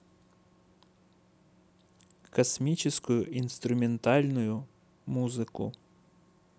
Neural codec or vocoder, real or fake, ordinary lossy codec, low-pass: none; real; none; none